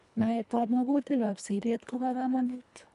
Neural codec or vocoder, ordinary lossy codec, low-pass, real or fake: codec, 24 kHz, 1.5 kbps, HILCodec; none; 10.8 kHz; fake